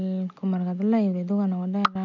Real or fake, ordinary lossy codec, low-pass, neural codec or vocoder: real; none; 7.2 kHz; none